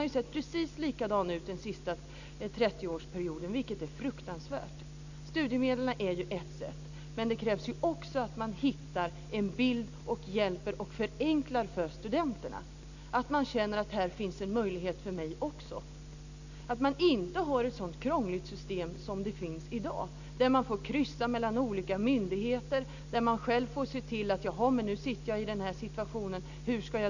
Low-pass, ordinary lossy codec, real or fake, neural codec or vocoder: 7.2 kHz; none; real; none